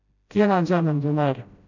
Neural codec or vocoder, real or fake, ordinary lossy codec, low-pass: codec, 16 kHz, 0.5 kbps, FreqCodec, smaller model; fake; none; 7.2 kHz